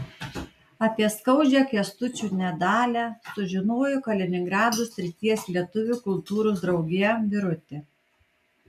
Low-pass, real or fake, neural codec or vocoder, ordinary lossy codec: 14.4 kHz; real; none; AAC, 96 kbps